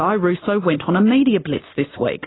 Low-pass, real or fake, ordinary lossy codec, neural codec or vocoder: 7.2 kHz; real; AAC, 16 kbps; none